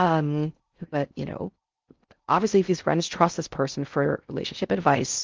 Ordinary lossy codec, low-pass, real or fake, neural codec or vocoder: Opus, 32 kbps; 7.2 kHz; fake; codec, 16 kHz in and 24 kHz out, 0.6 kbps, FocalCodec, streaming, 4096 codes